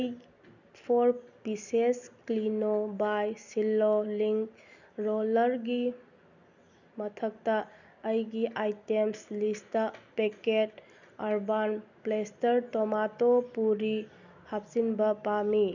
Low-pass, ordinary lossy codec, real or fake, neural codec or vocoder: 7.2 kHz; none; real; none